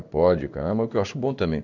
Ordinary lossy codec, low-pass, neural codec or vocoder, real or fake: none; 7.2 kHz; codec, 16 kHz in and 24 kHz out, 1 kbps, XY-Tokenizer; fake